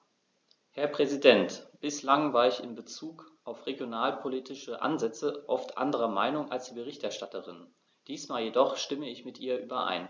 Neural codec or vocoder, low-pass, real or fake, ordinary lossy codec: none; none; real; none